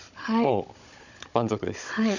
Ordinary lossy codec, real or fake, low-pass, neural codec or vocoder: none; fake; 7.2 kHz; codec, 16 kHz, 16 kbps, FunCodec, trained on Chinese and English, 50 frames a second